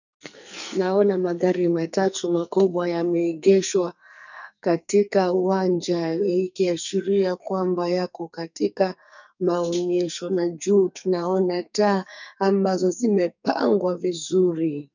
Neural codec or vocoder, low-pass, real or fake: codec, 44.1 kHz, 2.6 kbps, SNAC; 7.2 kHz; fake